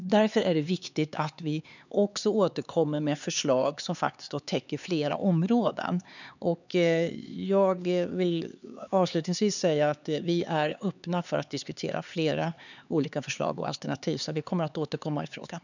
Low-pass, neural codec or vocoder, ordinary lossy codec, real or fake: 7.2 kHz; codec, 16 kHz, 4 kbps, X-Codec, HuBERT features, trained on LibriSpeech; none; fake